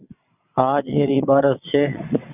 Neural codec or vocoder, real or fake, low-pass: vocoder, 22.05 kHz, 80 mel bands, WaveNeXt; fake; 3.6 kHz